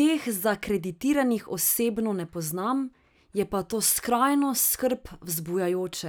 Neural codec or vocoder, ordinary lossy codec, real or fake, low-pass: none; none; real; none